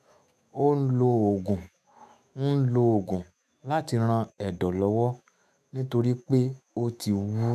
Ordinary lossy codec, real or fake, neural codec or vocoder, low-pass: AAC, 96 kbps; fake; autoencoder, 48 kHz, 128 numbers a frame, DAC-VAE, trained on Japanese speech; 14.4 kHz